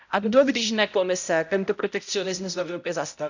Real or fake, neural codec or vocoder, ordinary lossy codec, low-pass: fake; codec, 16 kHz, 0.5 kbps, X-Codec, HuBERT features, trained on balanced general audio; none; 7.2 kHz